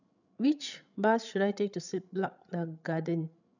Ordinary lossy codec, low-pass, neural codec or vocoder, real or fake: none; 7.2 kHz; codec, 16 kHz, 16 kbps, FreqCodec, larger model; fake